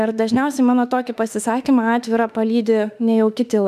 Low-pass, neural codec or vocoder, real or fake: 14.4 kHz; autoencoder, 48 kHz, 32 numbers a frame, DAC-VAE, trained on Japanese speech; fake